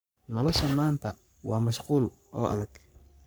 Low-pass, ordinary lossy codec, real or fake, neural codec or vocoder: none; none; fake; codec, 44.1 kHz, 3.4 kbps, Pupu-Codec